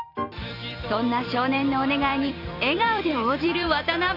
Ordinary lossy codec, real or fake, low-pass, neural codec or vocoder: none; fake; 5.4 kHz; vocoder, 44.1 kHz, 128 mel bands every 256 samples, BigVGAN v2